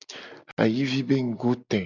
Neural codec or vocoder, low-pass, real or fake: none; 7.2 kHz; real